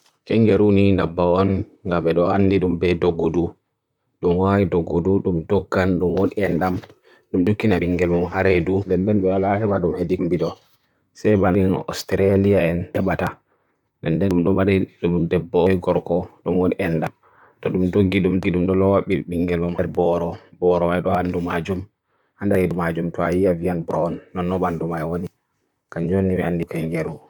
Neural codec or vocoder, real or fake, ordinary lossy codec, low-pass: vocoder, 44.1 kHz, 128 mel bands, Pupu-Vocoder; fake; none; 19.8 kHz